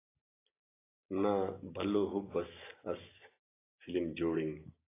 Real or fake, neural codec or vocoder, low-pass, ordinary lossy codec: real; none; 3.6 kHz; AAC, 16 kbps